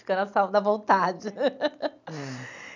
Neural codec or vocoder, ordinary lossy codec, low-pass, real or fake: none; none; 7.2 kHz; real